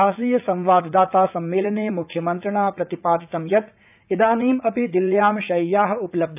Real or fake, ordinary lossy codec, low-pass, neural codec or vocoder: fake; none; 3.6 kHz; vocoder, 44.1 kHz, 80 mel bands, Vocos